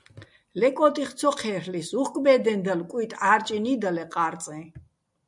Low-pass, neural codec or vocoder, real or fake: 10.8 kHz; none; real